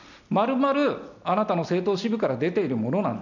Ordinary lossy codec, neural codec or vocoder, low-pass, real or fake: none; none; 7.2 kHz; real